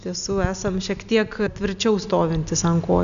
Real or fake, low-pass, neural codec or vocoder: real; 7.2 kHz; none